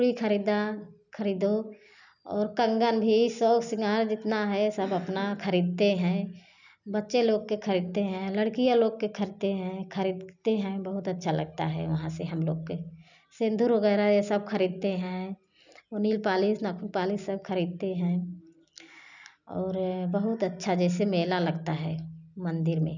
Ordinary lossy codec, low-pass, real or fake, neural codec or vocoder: none; 7.2 kHz; real; none